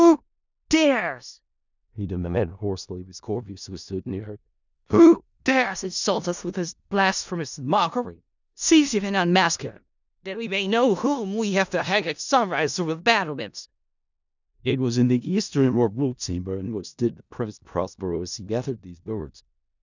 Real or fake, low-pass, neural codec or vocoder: fake; 7.2 kHz; codec, 16 kHz in and 24 kHz out, 0.4 kbps, LongCat-Audio-Codec, four codebook decoder